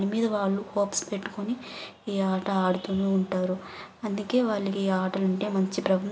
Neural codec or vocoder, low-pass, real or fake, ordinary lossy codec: none; none; real; none